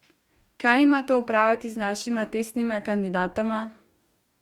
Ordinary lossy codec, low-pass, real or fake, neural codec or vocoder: Opus, 64 kbps; 19.8 kHz; fake; codec, 44.1 kHz, 2.6 kbps, DAC